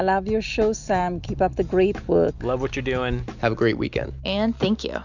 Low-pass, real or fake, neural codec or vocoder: 7.2 kHz; real; none